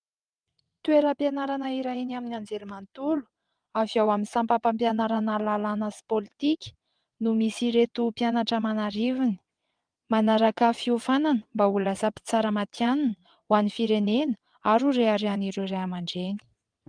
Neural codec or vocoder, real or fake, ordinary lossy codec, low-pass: vocoder, 22.05 kHz, 80 mel bands, WaveNeXt; fake; Opus, 32 kbps; 9.9 kHz